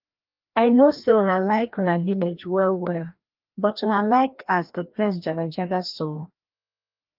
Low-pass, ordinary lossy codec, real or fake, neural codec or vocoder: 5.4 kHz; Opus, 32 kbps; fake; codec, 16 kHz, 1 kbps, FreqCodec, larger model